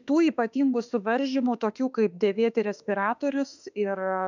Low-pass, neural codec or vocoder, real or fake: 7.2 kHz; autoencoder, 48 kHz, 32 numbers a frame, DAC-VAE, trained on Japanese speech; fake